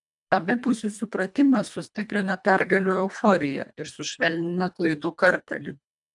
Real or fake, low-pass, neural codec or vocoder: fake; 10.8 kHz; codec, 24 kHz, 1.5 kbps, HILCodec